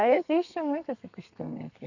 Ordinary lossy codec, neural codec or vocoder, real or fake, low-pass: none; codec, 32 kHz, 1.9 kbps, SNAC; fake; 7.2 kHz